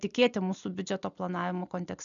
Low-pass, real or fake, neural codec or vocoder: 7.2 kHz; real; none